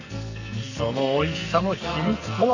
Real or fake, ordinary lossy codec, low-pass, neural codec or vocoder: fake; none; 7.2 kHz; codec, 44.1 kHz, 2.6 kbps, SNAC